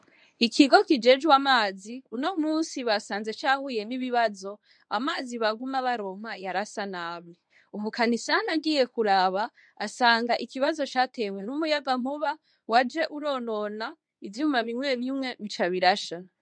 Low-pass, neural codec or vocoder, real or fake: 9.9 kHz; codec, 24 kHz, 0.9 kbps, WavTokenizer, medium speech release version 1; fake